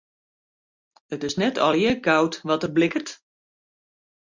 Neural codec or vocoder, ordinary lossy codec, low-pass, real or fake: none; MP3, 64 kbps; 7.2 kHz; real